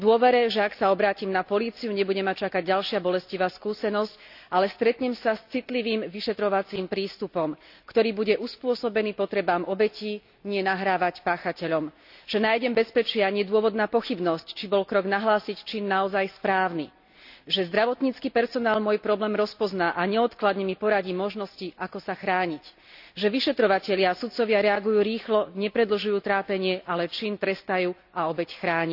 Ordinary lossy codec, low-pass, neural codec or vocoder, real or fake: none; 5.4 kHz; none; real